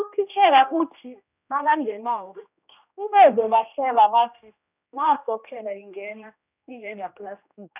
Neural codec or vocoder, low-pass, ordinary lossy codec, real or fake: codec, 16 kHz, 1 kbps, X-Codec, HuBERT features, trained on general audio; 3.6 kHz; none; fake